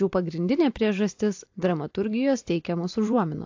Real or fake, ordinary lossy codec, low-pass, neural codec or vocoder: fake; AAC, 48 kbps; 7.2 kHz; vocoder, 44.1 kHz, 128 mel bands every 256 samples, BigVGAN v2